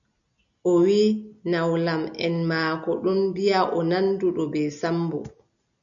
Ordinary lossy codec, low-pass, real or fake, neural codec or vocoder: MP3, 96 kbps; 7.2 kHz; real; none